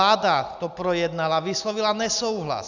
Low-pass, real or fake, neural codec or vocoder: 7.2 kHz; real; none